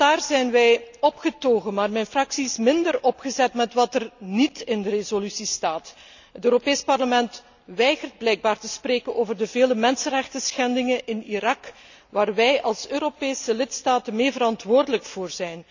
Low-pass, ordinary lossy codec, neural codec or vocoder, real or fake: 7.2 kHz; none; none; real